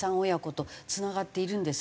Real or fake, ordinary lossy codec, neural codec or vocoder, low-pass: real; none; none; none